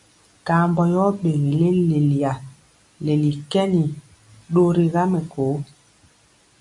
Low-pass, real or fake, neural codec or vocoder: 10.8 kHz; real; none